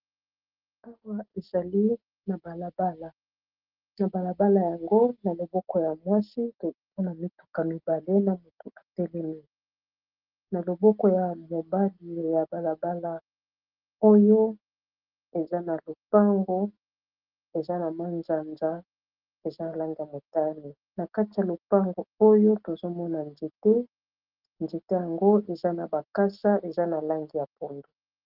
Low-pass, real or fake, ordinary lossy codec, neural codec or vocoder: 5.4 kHz; real; Opus, 16 kbps; none